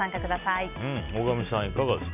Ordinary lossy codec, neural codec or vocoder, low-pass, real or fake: none; none; 3.6 kHz; real